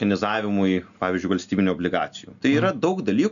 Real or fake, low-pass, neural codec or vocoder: real; 7.2 kHz; none